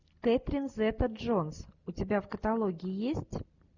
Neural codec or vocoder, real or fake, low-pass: none; real; 7.2 kHz